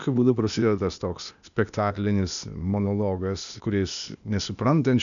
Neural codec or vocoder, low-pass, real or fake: codec, 16 kHz, 0.8 kbps, ZipCodec; 7.2 kHz; fake